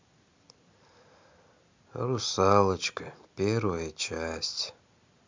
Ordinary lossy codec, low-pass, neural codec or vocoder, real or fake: MP3, 64 kbps; 7.2 kHz; none; real